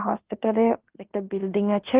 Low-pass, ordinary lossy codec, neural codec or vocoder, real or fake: 3.6 kHz; Opus, 16 kbps; codec, 16 kHz in and 24 kHz out, 0.9 kbps, LongCat-Audio-Codec, fine tuned four codebook decoder; fake